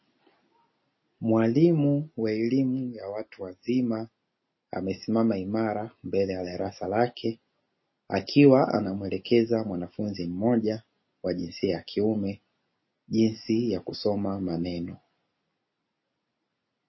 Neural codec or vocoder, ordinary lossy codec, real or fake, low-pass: none; MP3, 24 kbps; real; 7.2 kHz